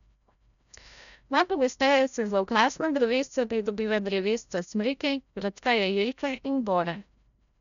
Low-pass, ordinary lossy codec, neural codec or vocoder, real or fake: 7.2 kHz; none; codec, 16 kHz, 0.5 kbps, FreqCodec, larger model; fake